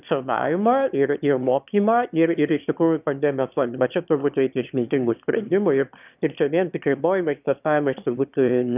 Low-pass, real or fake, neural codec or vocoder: 3.6 kHz; fake; autoencoder, 22.05 kHz, a latent of 192 numbers a frame, VITS, trained on one speaker